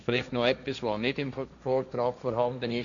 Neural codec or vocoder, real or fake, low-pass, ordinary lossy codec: codec, 16 kHz, 1.1 kbps, Voila-Tokenizer; fake; 7.2 kHz; none